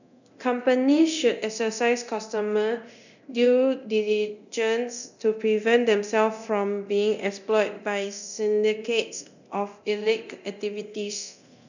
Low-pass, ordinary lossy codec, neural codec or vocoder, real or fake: 7.2 kHz; none; codec, 24 kHz, 0.9 kbps, DualCodec; fake